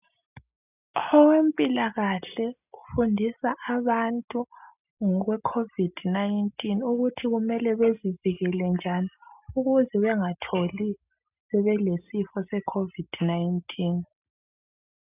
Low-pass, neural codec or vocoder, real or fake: 3.6 kHz; none; real